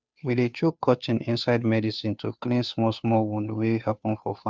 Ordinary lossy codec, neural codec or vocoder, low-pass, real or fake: none; codec, 16 kHz, 2 kbps, FunCodec, trained on Chinese and English, 25 frames a second; none; fake